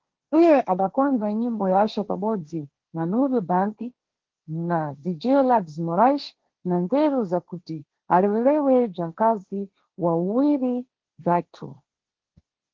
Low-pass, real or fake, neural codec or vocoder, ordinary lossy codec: 7.2 kHz; fake; codec, 16 kHz, 1.1 kbps, Voila-Tokenizer; Opus, 16 kbps